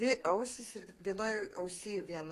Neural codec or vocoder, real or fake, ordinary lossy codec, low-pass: codec, 32 kHz, 1.9 kbps, SNAC; fake; AAC, 48 kbps; 14.4 kHz